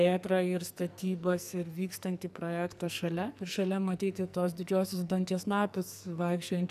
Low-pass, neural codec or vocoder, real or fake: 14.4 kHz; codec, 44.1 kHz, 2.6 kbps, SNAC; fake